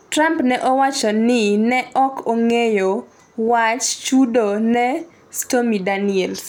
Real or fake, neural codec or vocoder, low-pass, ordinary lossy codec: real; none; 19.8 kHz; none